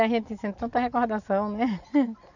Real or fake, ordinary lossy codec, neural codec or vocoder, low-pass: real; none; none; 7.2 kHz